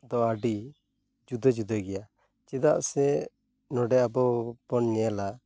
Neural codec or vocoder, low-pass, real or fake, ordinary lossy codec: none; none; real; none